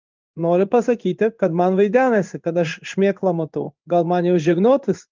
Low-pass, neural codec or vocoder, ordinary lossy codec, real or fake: 7.2 kHz; codec, 16 kHz in and 24 kHz out, 1 kbps, XY-Tokenizer; Opus, 24 kbps; fake